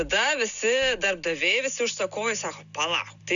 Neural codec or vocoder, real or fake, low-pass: none; real; 7.2 kHz